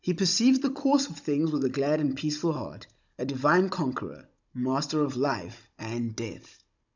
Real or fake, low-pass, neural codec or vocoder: fake; 7.2 kHz; codec, 16 kHz, 16 kbps, FunCodec, trained on Chinese and English, 50 frames a second